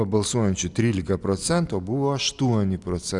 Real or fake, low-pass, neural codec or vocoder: real; 10.8 kHz; none